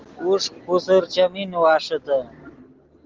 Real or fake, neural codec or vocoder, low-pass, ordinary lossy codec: real; none; 7.2 kHz; Opus, 16 kbps